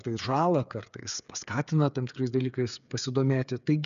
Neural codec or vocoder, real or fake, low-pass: codec, 16 kHz, 8 kbps, FreqCodec, smaller model; fake; 7.2 kHz